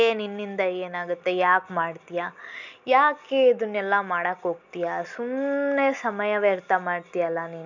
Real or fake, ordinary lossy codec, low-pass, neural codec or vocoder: real; none; 7.2 kHz; none